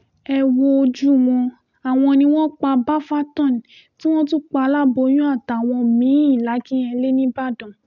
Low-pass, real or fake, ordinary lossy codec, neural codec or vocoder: 7.2 kHz; real; none; none